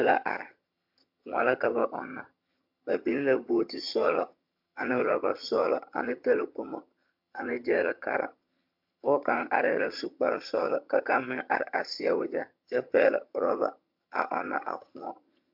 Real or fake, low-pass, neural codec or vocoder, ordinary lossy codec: fake; 5.4 kHz; vocoder, 22.05 kHz, 80 mel bands, HiFi-GAN; MP3, 48 kbps